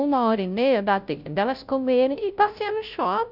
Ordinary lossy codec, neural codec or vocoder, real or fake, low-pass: none; codec, 16 kHz, 0.5 kbps, FunCodec, trained on Chinese and English, 25 frames a second; fake; 5.4 kHz